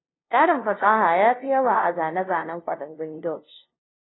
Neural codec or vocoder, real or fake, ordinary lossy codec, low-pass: codec, 16 kHz, 0.5 kbps, FunCodec, trained on LibriTTS, 25 frames a second; fake; AAC, 16 kbps; 7.2 kHz